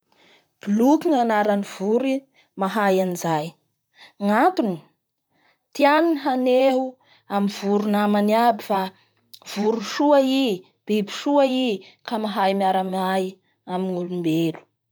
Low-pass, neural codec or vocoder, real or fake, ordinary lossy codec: none; vocoder, 44.1 kHz, 128 mel bands, Pupu-Vocoder; fake; none